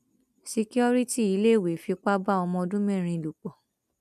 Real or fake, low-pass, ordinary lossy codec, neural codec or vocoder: real; 14.4 kHz; none; none